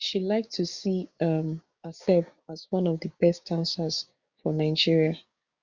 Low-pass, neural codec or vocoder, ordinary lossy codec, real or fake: 7.2 kHz; codec, 16 kHz, 6 kbps, DAC; Opus, 64 kbps; fake